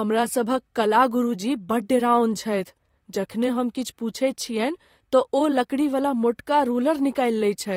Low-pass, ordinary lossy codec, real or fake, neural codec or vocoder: 19.8 kHz; AAC, 48 kbps; fake; vocoder, 44.1 kHz, 128 mel bands, Pupu-Vocoder